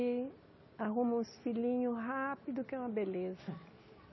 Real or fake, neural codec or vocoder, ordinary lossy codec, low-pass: real; none; MP3, 24 kbps; 7.2 kHz